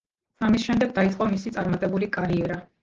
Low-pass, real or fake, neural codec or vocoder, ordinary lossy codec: 7.2 kHz; real; none; Opus, 16 kbps